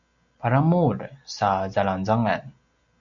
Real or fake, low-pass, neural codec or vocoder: real; 7.2 kHz; none